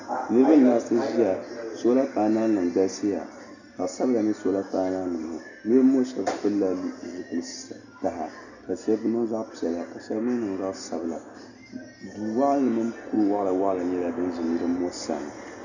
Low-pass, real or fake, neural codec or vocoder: 7.2 kHz; real; none